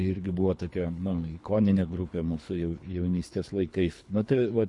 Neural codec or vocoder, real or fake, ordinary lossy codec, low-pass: codec, 24 kHz, 3 kbps, HILCodec; fake; MP3, 48 kbps; 10.8 kHz